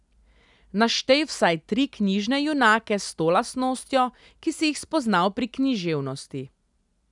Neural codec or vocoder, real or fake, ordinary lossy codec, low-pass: none; real; none; 10.8 kHz